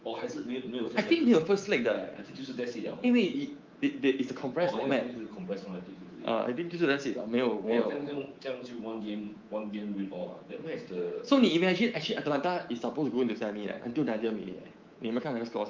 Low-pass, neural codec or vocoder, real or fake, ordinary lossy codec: 7.2 kHz; codec, 24 kHz, 3.1 kbps, DualCodec; fake; Opus, 24 kbps